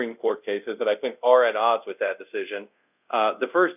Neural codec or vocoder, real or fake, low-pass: codec, 24 kHz, 0.5 kbps, DualCodec; fake; 3.6 kHz